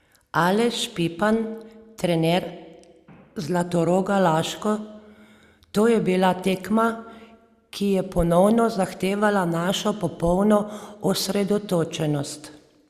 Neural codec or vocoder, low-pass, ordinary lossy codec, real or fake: none; 14.4 kHz; Opus, 64 kbps; real